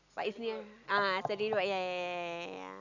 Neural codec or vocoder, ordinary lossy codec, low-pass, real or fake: none; none; 7.2 kHz; real